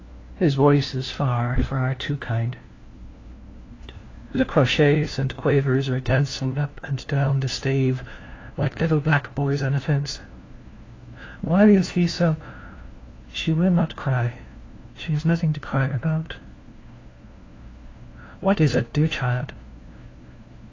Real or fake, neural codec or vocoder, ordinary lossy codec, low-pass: fake; codec, 16 kHz, 1 kbps, FunCodec, trained on LibriTTS, 50 frames a second; AAC, 32 kbps; 7.2 kHz